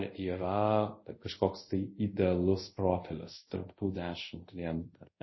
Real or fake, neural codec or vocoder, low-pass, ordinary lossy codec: fake; codec, 24 kHz, 0.5 kbps, DualCodec; 7.2 kHz; MP3, 24 kbps